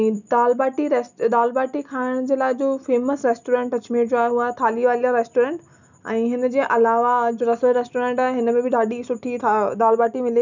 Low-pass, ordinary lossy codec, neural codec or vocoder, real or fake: 7.2 kHz; none; none; real